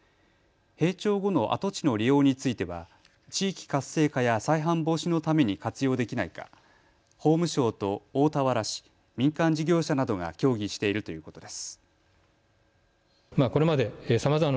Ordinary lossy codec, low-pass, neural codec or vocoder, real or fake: none; none; none; real